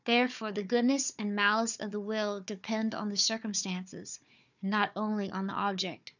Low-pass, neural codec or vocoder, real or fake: 7.2 kHz; codec, 16 kHz, 4 kbps, FunCodec, trained on Chinese and English, 50 frames a second; fake